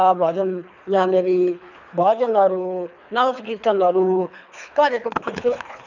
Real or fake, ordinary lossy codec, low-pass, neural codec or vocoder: fake; none; 7.2 kHz; codec, 24 kHz, 3 kbps, HILCodec